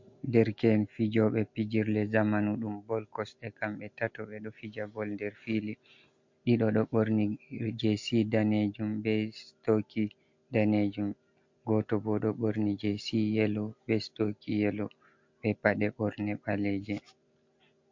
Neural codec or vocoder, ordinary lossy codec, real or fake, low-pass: none; MP3, 48 kbps; real; 7.2 kHz